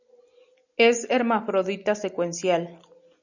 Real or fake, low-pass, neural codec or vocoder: real; 7.2 kHz; none